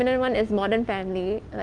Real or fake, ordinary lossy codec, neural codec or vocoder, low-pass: real; Opus, 24 kbps; none; 9.9 kHz